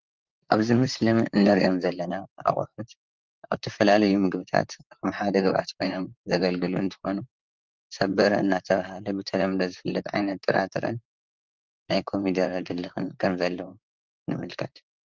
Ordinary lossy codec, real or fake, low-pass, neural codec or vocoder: Opus, 24 kbps; fake; 7.2 kHz; vocoder, 44.1 kHz, 128 mel bands, Pupu-Vocoder